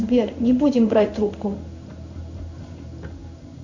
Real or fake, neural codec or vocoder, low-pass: fake; codec, 16 kHz in and 24 kHz out, 1 kbps, XY-Tokenizer; 7.2 kHz